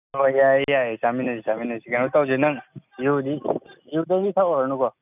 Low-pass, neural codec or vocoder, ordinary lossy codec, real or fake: 3.6 kHz; none; none; real